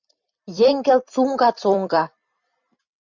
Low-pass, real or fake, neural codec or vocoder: 7.2 kHz; fake; vocoder, 44.1 kHz, 128 mel bands every 256 samples, BigVGAN v2